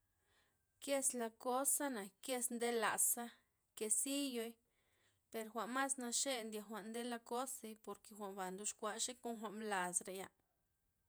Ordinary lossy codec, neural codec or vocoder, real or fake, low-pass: none; vocoder, 48 kHz, 128 mel bands, Vocos; fake; none